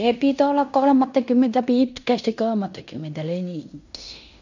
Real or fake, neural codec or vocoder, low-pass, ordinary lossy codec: fake; codec, 16 kHz in and 24 kHz out, 0.9 kbps, LongCat-Audio-Codec, fine tuned four codebook decoder; 7.2 kHz; none